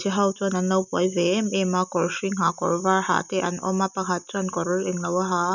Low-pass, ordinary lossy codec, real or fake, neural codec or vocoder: 7.2 kHz; none; real; none